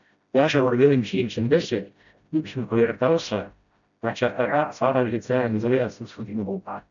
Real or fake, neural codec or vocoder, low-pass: fake; codec, 16 kHz, 0.5 kbps, FreqCodec, smaller model; 7.2 kHz